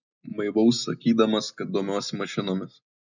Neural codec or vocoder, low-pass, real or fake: none; 7.2 kHz; real